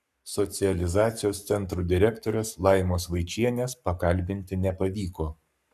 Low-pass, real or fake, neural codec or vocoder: 14.4 kHz; fake; codec, 44.1 kHz, 7.8 kbps, Pupu-Codec